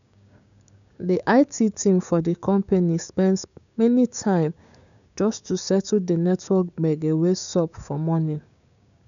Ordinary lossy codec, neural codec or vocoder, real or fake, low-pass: none; codec, 16 kHz, 6 kbps, DAC; fake; 7.2 kHz